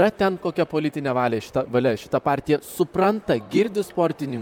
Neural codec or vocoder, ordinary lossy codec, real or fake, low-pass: vocoder, 44.1 kHz, 128 mel bands, Pupu-Vocoder; MP3, 96 kbps; fake; 19.8 kHz